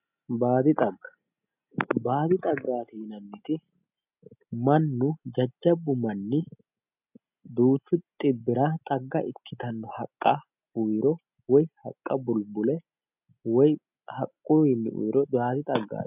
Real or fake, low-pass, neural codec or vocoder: real; 3.6 kHz; none